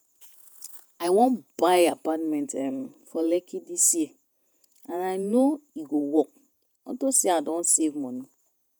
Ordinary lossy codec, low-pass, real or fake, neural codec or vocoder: none; none; fake; vocoder, 48 kHz, 128 mel bands, Vocos